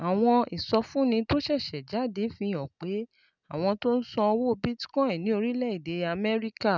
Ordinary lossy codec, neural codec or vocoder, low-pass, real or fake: none; none; 7.2 kHz; real